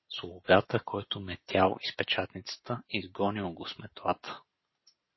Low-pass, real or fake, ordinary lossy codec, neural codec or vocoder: 7.2 kHz; real; MP3, 24 kbps; none